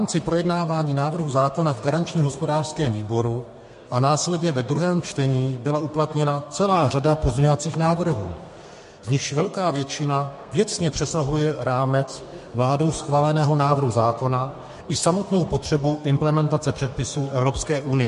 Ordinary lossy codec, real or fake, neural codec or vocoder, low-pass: MP3, 48 kbps; fake; codec, 32 kHz, 1.9 kbps, SNAC; 14.4 kHz